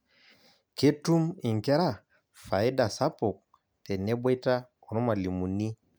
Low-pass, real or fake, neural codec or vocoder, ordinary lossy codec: none; real; none; none